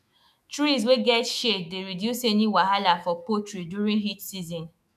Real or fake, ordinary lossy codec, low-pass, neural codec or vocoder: fake; none; 14.4 kHz; autoencoder, 48 kHz, 128 numbers a frame, DAC-VAE, trained on Japanese speech